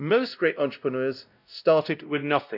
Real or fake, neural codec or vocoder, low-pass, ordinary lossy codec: fake; codec, 16 kHz, 0.5 kbps, X-Codec, WavLM features, trained on Multilingual LibriSpeech; 5.4 kHz; none